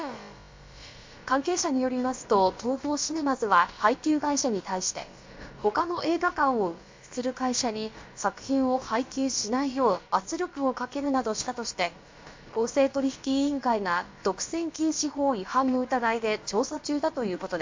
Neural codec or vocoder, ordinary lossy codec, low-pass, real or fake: codec, 16 kHz, about 1 kbps, DyCAST, with the encoder's durations; MP3, 48 kbps; 7.2 kHz; fake